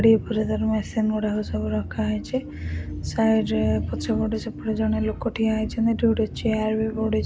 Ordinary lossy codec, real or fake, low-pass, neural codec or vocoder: none; real; none; none